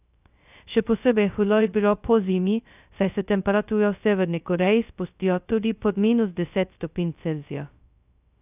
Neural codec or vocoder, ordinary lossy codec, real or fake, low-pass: codec, 16 kHz, 0.2 kbps, FocalCodec; none; fake; 3.6 kHz